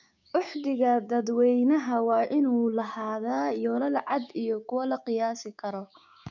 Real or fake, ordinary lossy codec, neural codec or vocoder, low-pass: fake; none; codec, 16 kHz, 6 kbps, DAC; 7.2 kHz